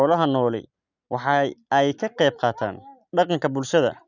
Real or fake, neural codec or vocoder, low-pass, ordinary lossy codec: real; none; 7.2 kHz; none